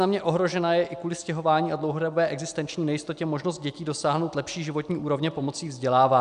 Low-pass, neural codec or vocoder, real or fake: 10.8 kHz; none; real